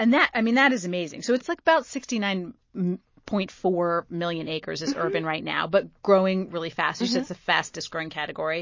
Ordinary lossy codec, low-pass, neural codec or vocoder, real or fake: MP3, 32 kbps; 7.2 kHz; none; real